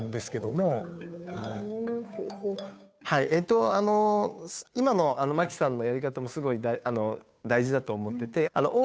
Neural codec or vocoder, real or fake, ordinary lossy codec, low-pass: codec, 16 kHz, 2 kbps, FunCodec, trained on Chinese and English, 25 frames a second; fake; none; none